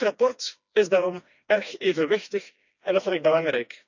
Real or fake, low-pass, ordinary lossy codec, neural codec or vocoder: fake; 7.2 kHz; none; codec, 16 kHz, 2 kbps, FreqCodec, smaller model